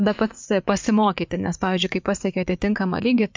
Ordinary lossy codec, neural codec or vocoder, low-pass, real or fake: MP3, 64 kbps; vocoder, 44.1 kHz, 80 mel bands, Vocos; 7.2 kHz; fake